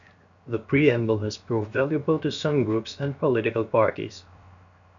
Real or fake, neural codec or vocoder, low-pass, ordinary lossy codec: fake; codec, 16 kHz, 0.7 kbps, FocalCodec; 7.2 kHz; MP3, 96 kbps